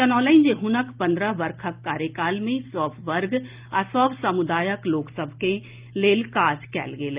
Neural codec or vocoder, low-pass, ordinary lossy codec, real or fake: none; 3.6 kHz; Opus, 24 kbps; real